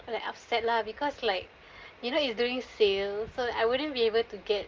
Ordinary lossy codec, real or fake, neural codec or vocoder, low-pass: Opus, 32 kbps; real; none; 7.2 kHz